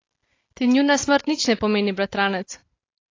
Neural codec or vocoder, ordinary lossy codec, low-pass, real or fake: none; AAC, 32 kbps; 7.2 kHz; real